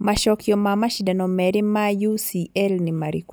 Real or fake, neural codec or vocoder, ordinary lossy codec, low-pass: real; none; none; none